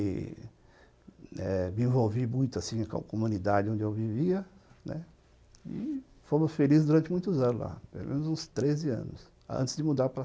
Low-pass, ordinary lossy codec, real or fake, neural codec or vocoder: none; none; real; none